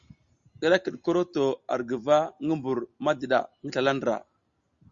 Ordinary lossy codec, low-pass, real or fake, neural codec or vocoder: Opus, 64 kbps; 7.2 kHz; real; none